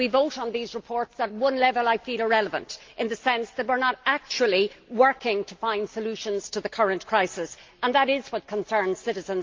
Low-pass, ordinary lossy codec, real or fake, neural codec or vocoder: 7.2 kHz; Opus, 24 kbps; real; none